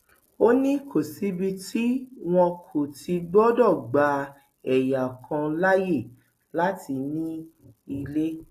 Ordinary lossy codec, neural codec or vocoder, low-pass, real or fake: AAC, 48 kbps; none; 14.4 kHz; real